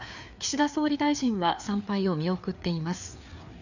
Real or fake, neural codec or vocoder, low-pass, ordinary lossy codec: fake; codec, 16 kHz, 4 kbps, FreqCodec, larger model; 7.2 kHz; none